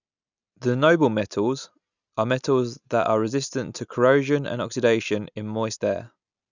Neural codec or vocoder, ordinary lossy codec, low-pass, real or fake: none; none; 7.2 kHz; real